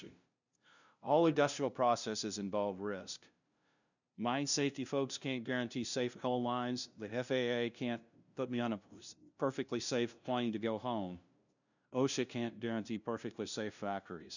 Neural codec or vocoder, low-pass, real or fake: codec, 16 kHz, 0.5 kbps, FunCodec, trained on LibriTTS, 25 frames a second; 7.2 kHz; fake